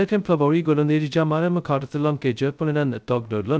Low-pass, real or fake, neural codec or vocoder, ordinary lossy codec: none; fake; codec, 16 kHz, 0.2 kbps, FocalCodec; none